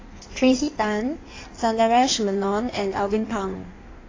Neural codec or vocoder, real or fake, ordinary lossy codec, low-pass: codec, 16 kHz in and 24 kHz out, 1.1 kbps, FireRedTTS-2 codec; fake; AAC, 32 kbps; 7.2 kHz